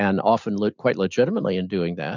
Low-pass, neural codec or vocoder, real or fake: 7.2 kHz; none; real